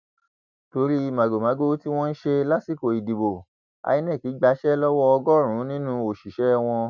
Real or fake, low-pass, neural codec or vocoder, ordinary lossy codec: real; 7.2 kHz; none; none